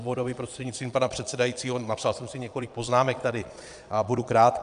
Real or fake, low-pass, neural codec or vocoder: fake; 9.9 kHz; vocoder, 22.05 kHz, 80 mel bands, Vocos